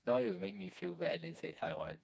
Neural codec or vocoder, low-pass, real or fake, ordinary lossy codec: codec, 16 kHz, 2 kbps, FreqCodec, smaller model; none; fake; none